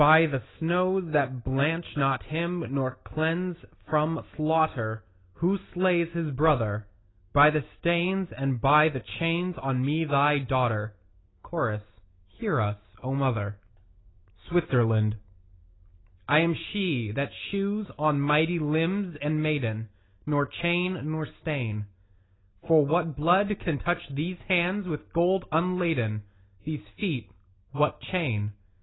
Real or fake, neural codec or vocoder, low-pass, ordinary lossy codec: real; none; 7.2 kHz; AAC, 16 kbps